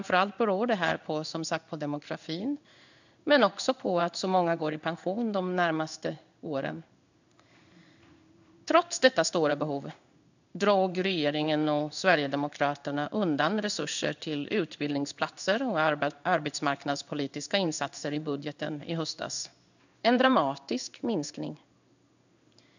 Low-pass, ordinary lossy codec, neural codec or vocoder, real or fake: 7.2 kHz; none; codec, 16 kHz in and 24 kHz out, 1 kbps, XY-Tokenizer; fake